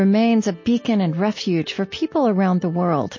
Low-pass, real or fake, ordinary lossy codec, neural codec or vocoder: 7.2 kHz; real; MP3, 32 kbps; none